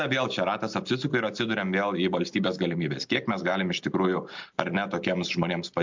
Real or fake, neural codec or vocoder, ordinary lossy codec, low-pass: real; none; MP3, 64 kbps; 7.2 kHz